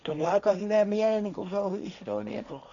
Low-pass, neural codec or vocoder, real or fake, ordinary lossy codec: 7.2 kHz; codec, 16 kHz, 1.1 kbps, Voila-Tokenizer; fake; none